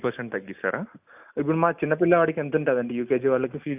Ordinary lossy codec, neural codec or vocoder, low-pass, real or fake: AAC, 32 kbps; none; 3.6 kHz; real